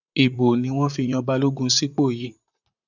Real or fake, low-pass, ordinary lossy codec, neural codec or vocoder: fake; 7.2 kHz; none; vocoder, 44.1 kHz, 128 mel bands, Pupu-Vocoder